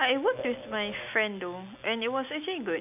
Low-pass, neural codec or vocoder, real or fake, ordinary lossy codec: 3.6 kHz; none; real; none